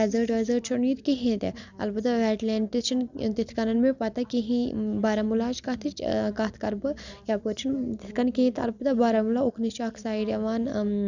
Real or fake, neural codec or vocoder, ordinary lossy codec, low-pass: real; none; none; 7.2 kHz